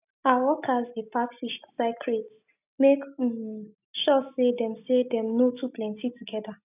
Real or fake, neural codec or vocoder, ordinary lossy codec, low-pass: real; none; none; 3.6 kHz